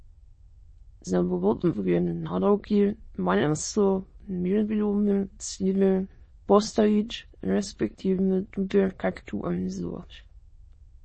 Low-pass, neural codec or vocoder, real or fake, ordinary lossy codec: 9.9 kHz; autoencoder, 22.05 kHz, a latent of 192 numbers a frame, VITS, trained on many speakers; fake; MP3, 32 kbps